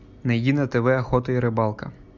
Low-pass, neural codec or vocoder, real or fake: 7.2 kHz; none; real